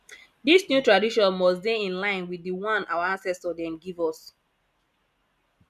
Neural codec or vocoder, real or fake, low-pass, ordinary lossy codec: none; real; 14.4 kHz; none